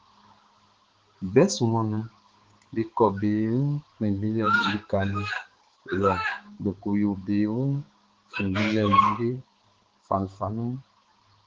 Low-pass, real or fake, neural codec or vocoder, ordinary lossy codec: 7.2 kHz; fake; codec, 16 kHz, 4 kbps, X-Codec, HuBERT features, trained on balanced general audio; Opus, 16 kbps